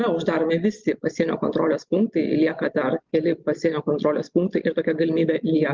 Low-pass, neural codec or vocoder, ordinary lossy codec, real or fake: 7.2 kHz; none; Opus, 32 kbps; real